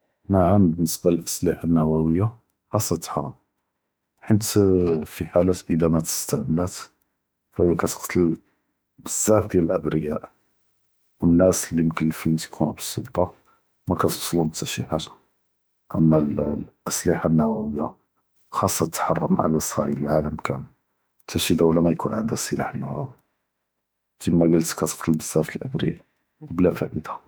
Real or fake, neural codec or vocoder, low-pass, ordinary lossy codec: fake; autoencoder, 48 kHz, 32 numbers a frame, DAC-VAE, trained on Japanese speech; none; none